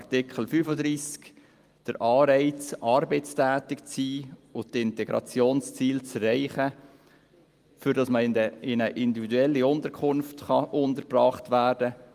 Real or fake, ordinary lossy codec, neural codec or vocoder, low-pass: real; Opus, 32 kbps; none; 14.4 kHz